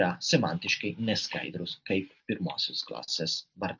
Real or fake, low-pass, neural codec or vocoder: real; 7.2 kHz; none